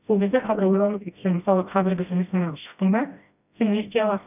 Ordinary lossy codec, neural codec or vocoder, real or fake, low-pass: none; codec, 16 kHz, 1 kbps, FreqCodec, smaller model; fake; 3.6 kHz